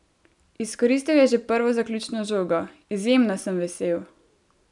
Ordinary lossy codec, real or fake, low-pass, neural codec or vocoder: none; real; 10.8 kHz; none